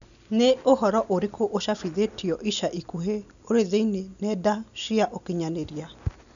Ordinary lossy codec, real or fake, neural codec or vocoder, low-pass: none; real; none; 7.2 kHz